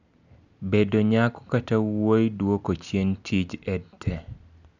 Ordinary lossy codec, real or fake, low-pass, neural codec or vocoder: none; real; 7.2 kHz; none